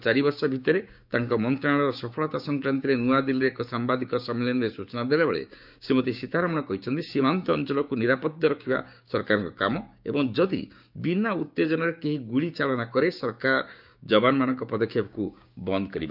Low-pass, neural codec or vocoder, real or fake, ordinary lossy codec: 5.4 kHz; codec, 16 kHz, 6 kbps, DAC; fake; none